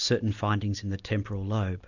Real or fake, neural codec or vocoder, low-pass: real; none; 7.2 kHz